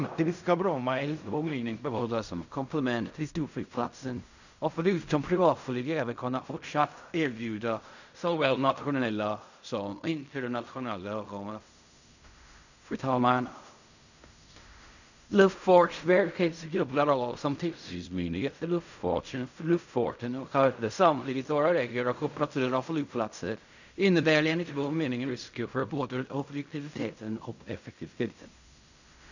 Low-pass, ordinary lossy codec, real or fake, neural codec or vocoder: 7.2 kHz; none; fake; codec, 16 kHz in and 24 kHz out, 0.4 kbps, LongCat-Audio-Codec, fine tuned four codebook decoder